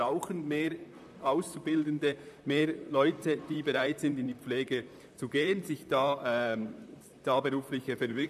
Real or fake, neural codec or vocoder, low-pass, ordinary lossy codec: fake; vocoder, 44.1 kHz, 128 mel bands, Pupu-Vocoder; 14.4 kHz; AAC, 96 kbps